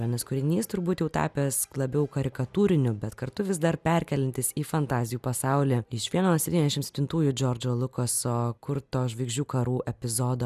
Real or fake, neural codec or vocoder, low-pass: real; none; 14.4 kHz